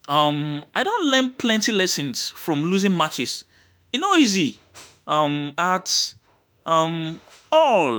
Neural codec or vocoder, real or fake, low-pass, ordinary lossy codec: autoencoder, 48 kHz, 32 numbers a frame, DAC-VAE, trained on Japanese speech; fake; none; none